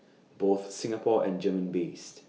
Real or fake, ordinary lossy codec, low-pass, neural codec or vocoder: real; none; none; none